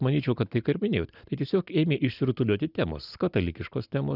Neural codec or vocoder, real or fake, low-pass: none; real; 5.4 kHz